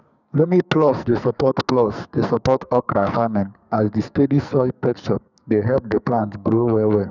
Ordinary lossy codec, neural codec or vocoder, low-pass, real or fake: none; codec, 44.1 kHz, 2.6 kbps, SNAC; 7.2 kHz; fake